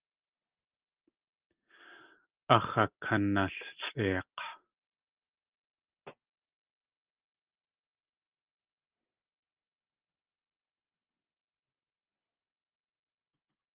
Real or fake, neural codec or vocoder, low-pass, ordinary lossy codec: real; none; 3.6 kHz; Opus, 24 kbps